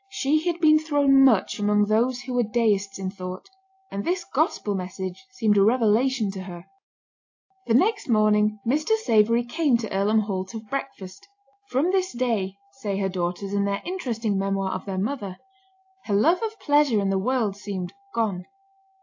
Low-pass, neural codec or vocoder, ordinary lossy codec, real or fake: 7.2 kHz; none; AAC, 48 kbps; real